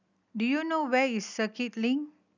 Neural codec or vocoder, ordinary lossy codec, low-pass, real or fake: none; none; 7.2 kHz; real